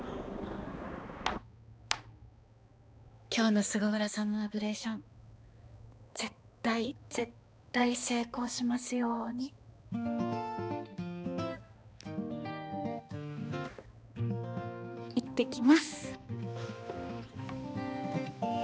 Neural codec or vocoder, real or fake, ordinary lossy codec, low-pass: codec, 16 kHz, 2 kbps, X-Codec, HuBERT features, trained on balanced general audio; fake; none; none